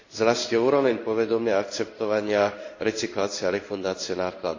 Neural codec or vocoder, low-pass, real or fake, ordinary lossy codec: codec, 16 kHz in and 24 kHz out, 1 kbps, XY-Tokenizer; 7.2 kHz; fake; AAC, 48 kbps